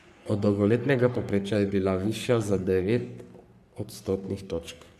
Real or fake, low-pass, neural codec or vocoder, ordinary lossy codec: fake; 14.4 kHz; codec, 44.1 kHz, 3.4 kbps, Pupu-Codec; AAC, 96 kbps